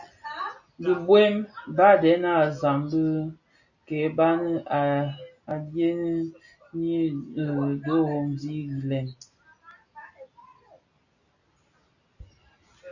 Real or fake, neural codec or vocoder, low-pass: real; none; 7.2 kHz